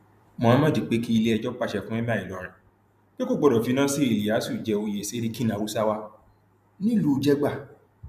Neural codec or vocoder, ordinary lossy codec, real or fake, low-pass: vocoder, 44.1 kHz, 128 mel bands every 256 samples, BigVGAN v2; none; fake; 14.4 kHz